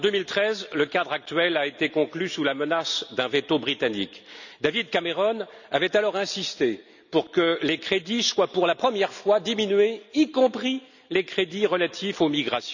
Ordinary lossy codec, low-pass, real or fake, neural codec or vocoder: none; 7.2 kHz; real; none